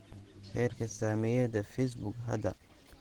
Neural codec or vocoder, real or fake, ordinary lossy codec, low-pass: vocoder, 44.1 kHz, 128 mel bands every 512 samples, BigVGAN v2; fake; Opus, 16 kbps; 19.8 kHz